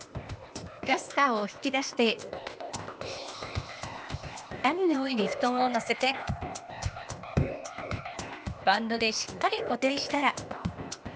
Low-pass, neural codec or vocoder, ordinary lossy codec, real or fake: none; codec, 16 kHz, 0.8 kbps, ZipCodec; none; fake